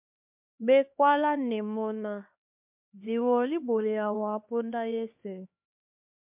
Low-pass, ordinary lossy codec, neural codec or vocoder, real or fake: 3.6 kHz; MP3, 32 kbps; codec, 16 kHz, 2 kbps, X-Codec, HuBERT features, trained on LibriSpeech; fake